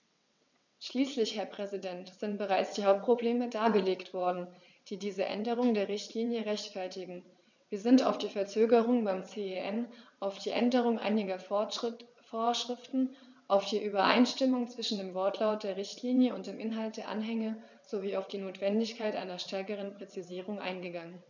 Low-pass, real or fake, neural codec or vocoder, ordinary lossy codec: 7.2 kHz; fake; vocoder, 22.05 kHz, 80 mel bands, WaveNeXt; none